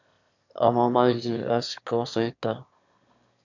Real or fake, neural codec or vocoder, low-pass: fake; autoencoder, 22.05 kHz, a latent of 192 numbers a frame, VITS, trained on one speaker; 7.2 kHz